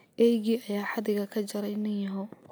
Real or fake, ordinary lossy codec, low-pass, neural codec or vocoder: real; none; none; none